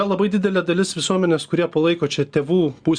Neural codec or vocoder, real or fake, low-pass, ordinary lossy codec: none; real; 9.9 kHz; Opus, 64 kbps